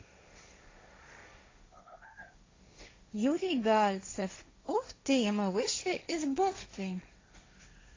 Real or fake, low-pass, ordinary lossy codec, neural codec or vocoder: fake; 7.2 kHz; AAC, 32 kbps; codec, 16 kHz, 1.1 kbps, Voila-Tokenizer